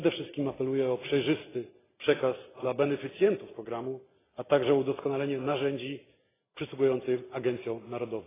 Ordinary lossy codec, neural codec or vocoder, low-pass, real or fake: AAC, 16 kbps; none; 3.6 kHz; real